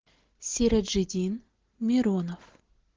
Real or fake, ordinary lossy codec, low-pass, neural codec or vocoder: real; Opus, 16 kbps; 7.2 kHz; none